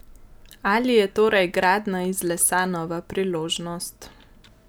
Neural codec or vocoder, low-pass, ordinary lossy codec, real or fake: none; none; none; real